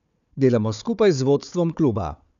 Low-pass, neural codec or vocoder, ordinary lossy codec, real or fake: 7.2 kHz; codec, 16 kHz, 4 kbps, FunCodec, trained on Chinese and English, 50 frames a second; none; fake